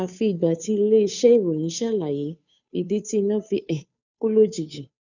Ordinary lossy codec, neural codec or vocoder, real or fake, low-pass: none; codec, 16 kHz, 2 kbps, FunCodec, trained on Chinese and English, 25 frames a second; fake; 7.2 kHz